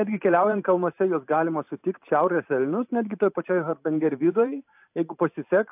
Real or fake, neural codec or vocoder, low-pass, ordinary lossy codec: fake; vocoder, 44.1 kHz, 128 mel bands every 512 samples, BigVGAN v2; 3.6 kHz; AAC, 32 kbps